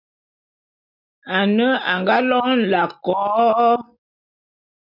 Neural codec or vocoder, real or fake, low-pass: none; real; 5.4 kHz